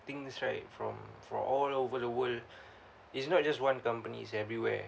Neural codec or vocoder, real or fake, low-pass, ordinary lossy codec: none; real; none; none